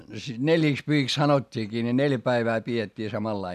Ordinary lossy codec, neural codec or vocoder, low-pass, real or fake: none; none; 14.4 kHz; real